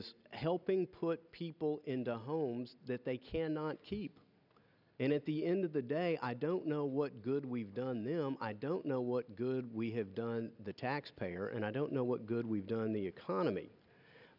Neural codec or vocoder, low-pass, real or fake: none; 5.4 kHz; real